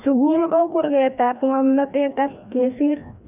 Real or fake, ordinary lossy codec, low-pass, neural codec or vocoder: fake; none; 3.6 kHz; codec, 16 kHz, 1 kbps, FreqCodec, larger model